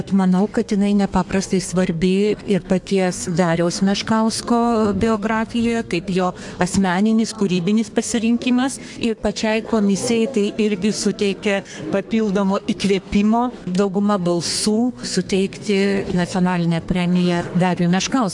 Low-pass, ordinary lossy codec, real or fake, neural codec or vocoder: 10.8 kHz; AAC, 64 kbps; fake; codec, 32 kHz, 1.9 kbps, SNAC